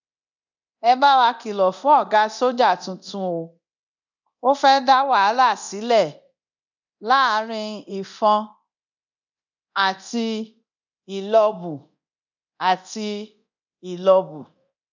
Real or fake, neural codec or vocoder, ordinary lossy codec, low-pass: fake; codec, 24 kHz, 0.9 kbps, DualCodec; none; 7.2 kHz